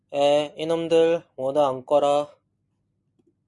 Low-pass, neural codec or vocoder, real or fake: 10.8 kHz; none; real